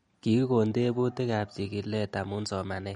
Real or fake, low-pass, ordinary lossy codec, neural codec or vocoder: real; 19.8 kHz; MP3, 48 kbps; none